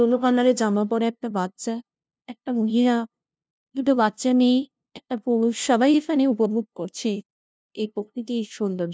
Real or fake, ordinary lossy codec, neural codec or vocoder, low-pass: fake; none; codec, 16 kHz, 0.5 kbps, FunCodec, trained on LibriTTS, 25 frames a second; none